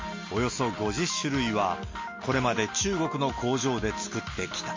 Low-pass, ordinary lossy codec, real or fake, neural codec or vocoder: 7.2 kHz; MP3, 32 kbps; real; none